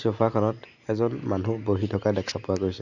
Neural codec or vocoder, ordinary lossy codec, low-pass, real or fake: none; none; 7.2 kHz; real